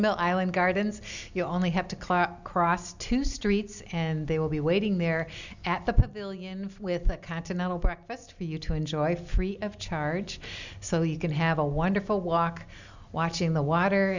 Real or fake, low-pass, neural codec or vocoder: real; 7.2 kHz; none